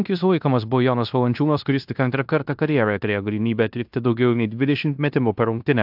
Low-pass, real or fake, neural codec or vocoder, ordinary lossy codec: 5.4 kHz; fake; codec, 16 kHz in and 24 kHz out, 0.9 kbps, LongCat-Audio-Codec, fine tuned four codebook decoder; AAC, 48 kbps